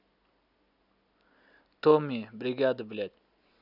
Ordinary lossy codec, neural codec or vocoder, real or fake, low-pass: none; none; real; 5.4 kHz